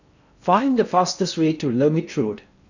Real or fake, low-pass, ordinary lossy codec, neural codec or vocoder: fake; 7.2 kHz; none; codec, 16 kHz in and 24 kHz out, 0.6 kbps, FocalCodec, streaming, 4096 codes